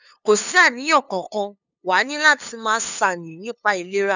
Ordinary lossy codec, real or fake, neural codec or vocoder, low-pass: none; fake; codec, 16 kHz, 2 kbps, FunCodec, trained on LibriTTS, 25 frames a second; 7.2 kHz